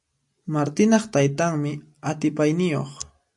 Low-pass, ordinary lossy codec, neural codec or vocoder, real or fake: 10.8 kHz; AAC, 64 kbps; none; real